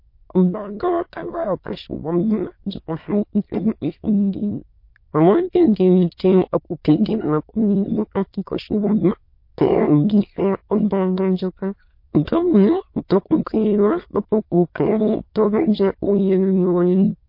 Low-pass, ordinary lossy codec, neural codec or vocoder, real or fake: 5.4 kHz; MP3, 32 kbps; autoencoder, 22.05 kHz, a latent of 192 numbers a frame, VITS, trained on many speakers; fake